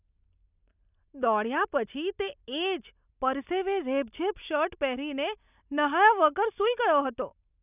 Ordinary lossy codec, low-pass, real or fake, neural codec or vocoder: none; 3.6 kHz; real; none